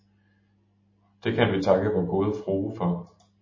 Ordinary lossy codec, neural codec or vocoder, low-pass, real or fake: MP3, 32 kbps; none; 7.2 kHz; real